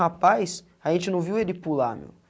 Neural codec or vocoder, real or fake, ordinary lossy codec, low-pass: none; real; none; none